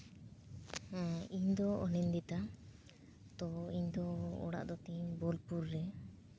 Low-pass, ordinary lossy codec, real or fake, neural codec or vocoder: none; none; real; none